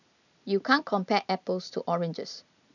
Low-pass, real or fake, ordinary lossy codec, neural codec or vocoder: 7.2 kHz; real; none; none